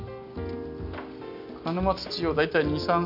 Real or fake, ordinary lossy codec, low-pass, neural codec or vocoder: real; none; 5.4 kHz; none